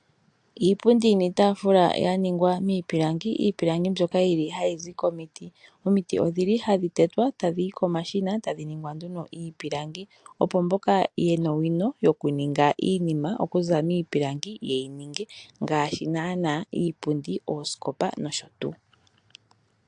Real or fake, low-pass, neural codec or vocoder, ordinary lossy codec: real; 10.8 kHz; none; AAC, 64 kbps